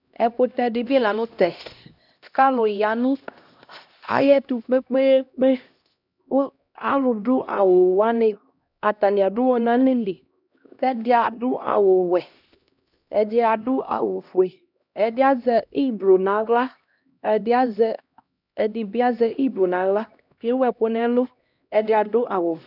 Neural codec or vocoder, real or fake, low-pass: codec, 16 kHz, 1 kbps, X-Codec, HuBERT features, trained on LibriSpeech; fake; 5.4 kHz